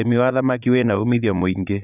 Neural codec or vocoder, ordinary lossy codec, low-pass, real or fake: vocoder, 22.05 kHz, 80 mel bands, Vocos; none; 3.6 kHz; fake